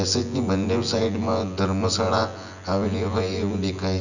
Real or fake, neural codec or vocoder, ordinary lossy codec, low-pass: fake; vocoder, 24 kHz, 100 mel bands, Vocos; none; 7.2 kHz